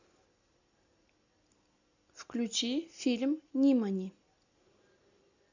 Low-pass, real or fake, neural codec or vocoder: 7.2 kHz; real; none